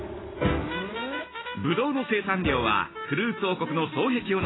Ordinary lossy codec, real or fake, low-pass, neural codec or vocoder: AAC, 16 kbps; real; 7.2 kHz; none